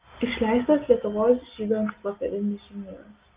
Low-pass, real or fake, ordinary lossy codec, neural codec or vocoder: 3.6 kHz; real; Opus, 24 kbps; none